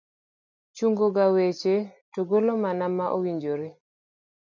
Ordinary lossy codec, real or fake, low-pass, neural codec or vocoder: MP3, 64 kbps; real; 7.2 kHz; none